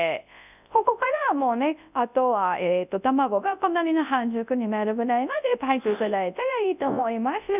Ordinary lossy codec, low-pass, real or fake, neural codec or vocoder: none; 3.6 kHz; fake; codec, 24 kHz, 0.9 kbps, WavTokenizer, large speech release